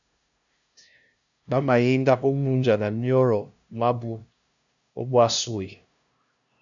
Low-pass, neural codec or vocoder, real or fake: 7.2 kHz; codec, 16 kHz, 0.5 kbps, FunCodec, trained on LibriTTS, 25 frames a second; fake